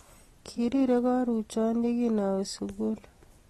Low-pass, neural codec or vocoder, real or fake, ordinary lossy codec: 19.8 kHz; none; real; AAC, 32 kbps